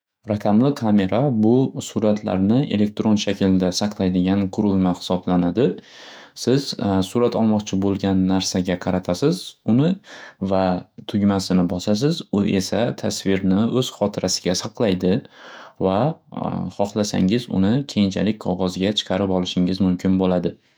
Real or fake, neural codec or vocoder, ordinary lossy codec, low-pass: fake; autoencoder, 48 kHz, 128 numbers a frame, DAC-VAE, trained on Japanese speech; none; none